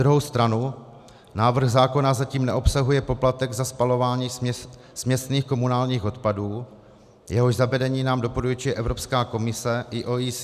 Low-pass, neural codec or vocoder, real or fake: 14.4 kHz; none; real